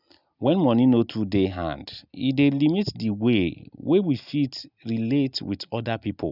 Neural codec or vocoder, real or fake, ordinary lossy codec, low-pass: none; real; none; 5.4 kHz